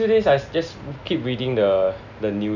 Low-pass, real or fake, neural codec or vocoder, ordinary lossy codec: 7.2 kHz; real; none; none